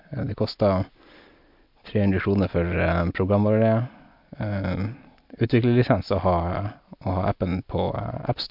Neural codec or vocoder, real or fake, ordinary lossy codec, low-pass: none; real; MP3, 48 kbps; 5.4 kHz